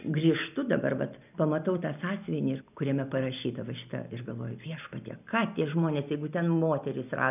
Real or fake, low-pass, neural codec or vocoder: real; 3.6 kHz; none